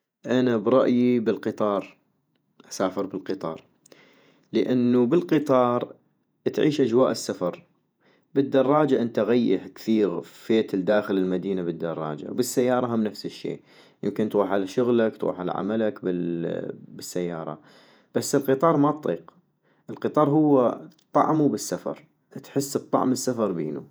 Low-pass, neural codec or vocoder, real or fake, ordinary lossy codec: none; vocoder, 48 kHz, 128 mel bands, Vocos; fake; none